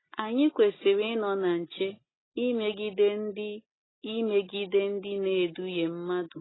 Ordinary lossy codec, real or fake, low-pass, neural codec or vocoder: AAC, 16 kbps; real; 7.2 kHz; none